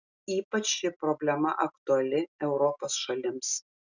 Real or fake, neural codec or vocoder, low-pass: real; none; 7.2 kHz